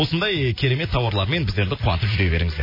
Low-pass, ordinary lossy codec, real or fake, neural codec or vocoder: 5.4 kHz; MP3, 24 kbps; real; none